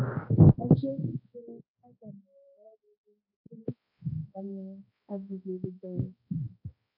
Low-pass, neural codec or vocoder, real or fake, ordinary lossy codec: 5.4 kHz; codec, 16 kHz, 1 kbps, X-Codec, HuBERT features, trained on balanced general audio; fake; MP3, 32 kbps